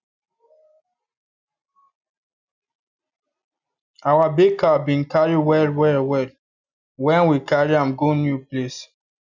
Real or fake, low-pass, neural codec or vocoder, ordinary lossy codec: real; 7.2 kHz; none; none